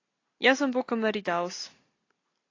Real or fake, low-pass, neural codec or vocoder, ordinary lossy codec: real; 7.2 kHz; none; AAC, 32 kbps